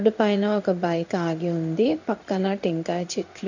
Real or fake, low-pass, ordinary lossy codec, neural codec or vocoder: fake; 7.2 kHz; none; codec, 16 kHz in and 24 kHz out, 1 kbps, XY-Tokenizer